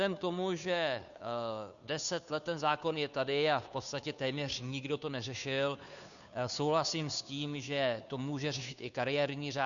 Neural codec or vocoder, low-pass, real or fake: codec, 16 kHz, 2 kbps, FunCodec, trained on Chinese and English, 25 frames a second; 7.2 kHz; fake